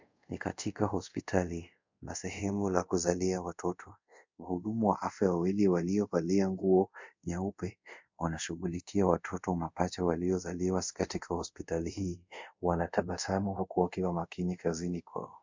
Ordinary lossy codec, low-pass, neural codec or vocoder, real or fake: MP3, 64 kbps; 7.2 kHz; codec, 24 kHz, 0.5 kbps, DualCodec; fake